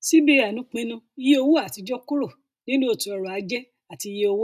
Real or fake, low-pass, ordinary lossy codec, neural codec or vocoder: real; 14.4 kHz; none; none